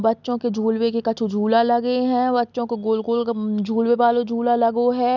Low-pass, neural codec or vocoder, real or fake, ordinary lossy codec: 7.2 kHz; none; real; none